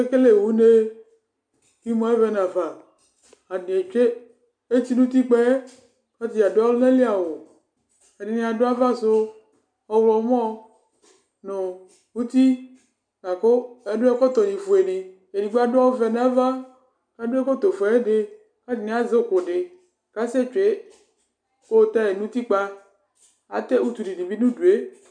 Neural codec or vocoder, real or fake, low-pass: none; real; 9.9 kHz